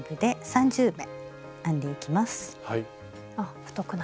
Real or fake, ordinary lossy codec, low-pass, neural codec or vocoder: real; none; none; none